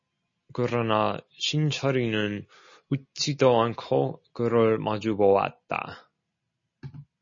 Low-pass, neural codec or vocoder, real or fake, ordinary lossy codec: 7.2 kHz; none; real; MP3, 32 kbps